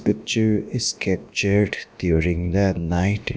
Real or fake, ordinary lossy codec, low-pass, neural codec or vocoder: fake; none; none; codec, 16 kHz, about 1 kbps, DyCAST, with the encoder's durations